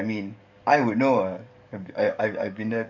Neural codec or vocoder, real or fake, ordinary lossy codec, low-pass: codec, 44.1 kHz, 7.8 kbps, DAC; fake; none; 7.2 kHz